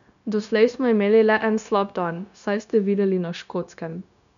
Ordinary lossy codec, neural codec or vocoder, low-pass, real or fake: none; codec, 16 kHz, 0.9 kbps, LongCat-Audio-Codec; 7.2 kHz; fake